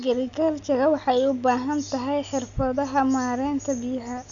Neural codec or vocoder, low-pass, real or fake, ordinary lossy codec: none; 7.2 kHz; real; none